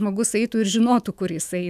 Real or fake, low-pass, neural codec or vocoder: fake; 14.4 kHz; autoencoder, 48 kHz, 128 numbers a frame, DAC-VAE, trained on Japanese speech